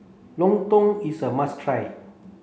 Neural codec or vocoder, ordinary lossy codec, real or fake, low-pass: none; none; real; none